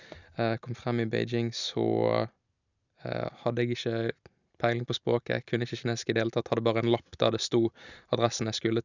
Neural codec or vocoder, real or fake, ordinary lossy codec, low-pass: none; real; none; 7.2 kHz